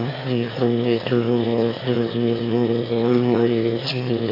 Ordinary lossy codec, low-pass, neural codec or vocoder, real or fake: AAC, 24 kbps; 5.4 kHz; autoencoder, 22.05 kHz, a latent of 192 numbers a frame, VITS, trained on one speaker; fake